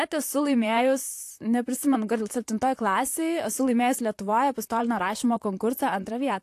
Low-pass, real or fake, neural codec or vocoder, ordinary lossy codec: 14.4 kHz; fake; vocoder, 44.1 kHz, 128 mel bands, Pupu-Vocoder; AAC, 64 kbps